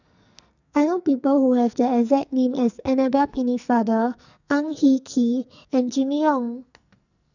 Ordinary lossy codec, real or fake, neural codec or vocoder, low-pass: none; fake; codec, 44.1 kHz, 2.6 kbps, SNAC; 7.2 kHz